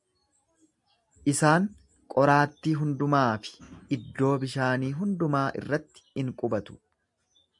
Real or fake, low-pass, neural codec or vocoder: real; 10.8 kHz; none